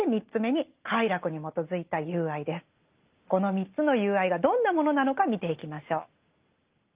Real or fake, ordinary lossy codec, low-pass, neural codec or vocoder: real; Opus, 32 kbps; 3.6 kHz; none